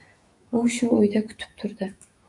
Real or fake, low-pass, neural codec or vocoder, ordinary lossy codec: fake; 10.8 kHz; autoencoder, 48 kHz, 128 numbers a frame, DAC-VAE, trained on Japanese speech; MP3, 96 kbps